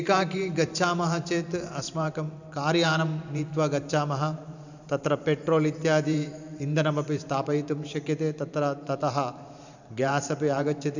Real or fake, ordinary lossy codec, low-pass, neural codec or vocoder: fake; none; 7.2 kHz; vocoder, 44.1 kHz, 128 mel bands every 512 samples, BigVGAN v2